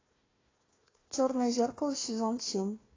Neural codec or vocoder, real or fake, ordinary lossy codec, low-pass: codec, 16 kHz, 1 kbps, FunCodec, trained on Chinese and English, 50 frames a second; fake; AAC, 32 kbps; 7.2 kHz